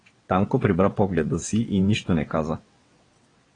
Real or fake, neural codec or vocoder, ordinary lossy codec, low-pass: fake; vocoder, 22.05 kHz, 80 mel bands, WaveNeXt; AAC, 32 kbps; 9.9 kHz